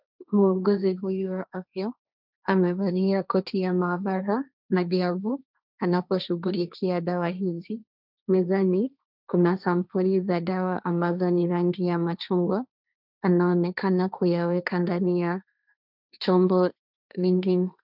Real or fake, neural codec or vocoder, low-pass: fake; codec, 16 kHz, 1.1 kbps, Voila-Tokenizer; 5.4 kHz